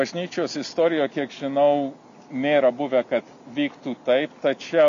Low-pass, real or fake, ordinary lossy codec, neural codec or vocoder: 7.2 kHz; real; MP3, 64 kbps; none